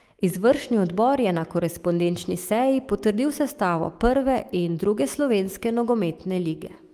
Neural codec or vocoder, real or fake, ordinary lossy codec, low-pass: autoencoder, 48 kHz, 128 numbers a frame, DAC-VAE, trained on Japanese speech; fake; Opus, 24 kbps; 14.4 kHz